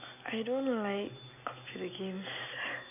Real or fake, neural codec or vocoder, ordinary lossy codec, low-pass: real; none; none; 3.6 kHz